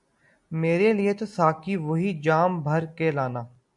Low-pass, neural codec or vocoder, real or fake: 10.8 kHz; none; real